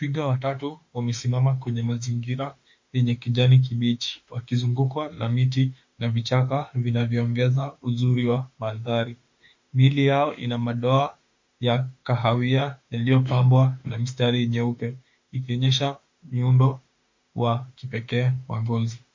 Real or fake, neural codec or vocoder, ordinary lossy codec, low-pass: fake; autoencoder, 48 kHz, 32 numbers a frame, DAC-VAE, trained on Japanese speech; MP3, 48 kbps; 7.2 kHz